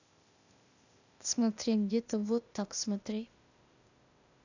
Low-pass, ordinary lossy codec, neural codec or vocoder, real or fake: 7.2 kHz; none; codec, 16 kHz, 0.8 kbps, ZipCodec; fake